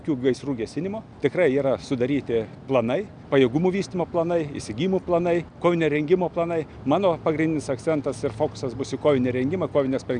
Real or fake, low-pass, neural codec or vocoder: real; 9.9 kHz; none